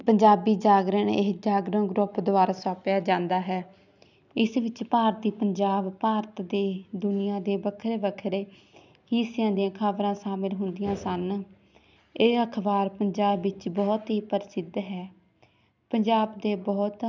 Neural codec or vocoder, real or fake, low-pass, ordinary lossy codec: none; real; 7.2 kHz; none